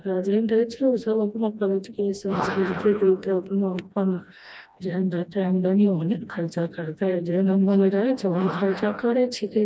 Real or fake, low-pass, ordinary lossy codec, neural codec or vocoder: fake; none; none; codec, 16 kHz, 1 kbps, FreqCodec, smaller model